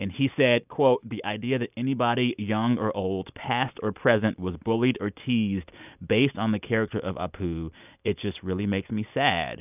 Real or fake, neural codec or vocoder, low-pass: real; none; 3.6 kHz